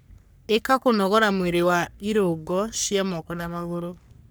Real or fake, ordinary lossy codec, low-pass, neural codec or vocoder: fake; none; none; codec, 44.1 kHz, 3.4 kbps, Pupu-Codec